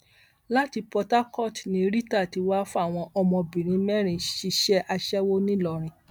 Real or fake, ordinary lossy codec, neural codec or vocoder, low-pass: real; none; none; none